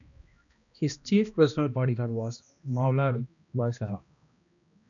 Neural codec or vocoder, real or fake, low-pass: codec, 16 kHz, 1 kbps, X-Codec, HuBERT features, trained on balanced general audio; fake; 7.2 kHz